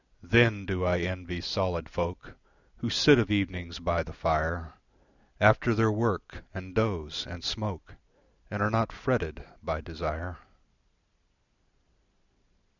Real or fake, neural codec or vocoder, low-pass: real; none; 7.2 kHz